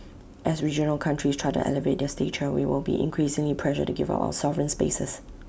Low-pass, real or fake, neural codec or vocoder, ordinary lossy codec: none; real; none; none